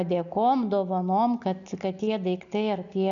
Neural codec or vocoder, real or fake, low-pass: none; real; 7.2 kHz